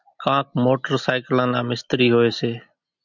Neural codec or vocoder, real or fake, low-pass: none; real; 7.2 kHz